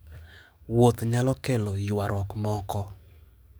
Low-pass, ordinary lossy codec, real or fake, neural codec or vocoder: none; none; fake; codec, 44.1 kHz, 7.8 kbps, DAC